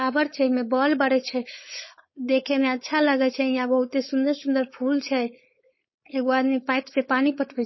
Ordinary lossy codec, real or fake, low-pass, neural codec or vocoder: MP3, 24 kbps; fake; 7.2 kHz; codec, 16 kHz, 4.8 kbps, FACodec